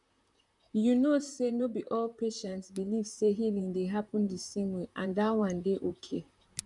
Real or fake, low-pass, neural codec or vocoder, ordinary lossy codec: fake; 10.8 kHz; vocoder, 44.1 kHz, 128 mel bands, Pupu-Vocoder; none